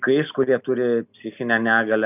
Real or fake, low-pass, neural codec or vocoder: real; 3.6 kHz; none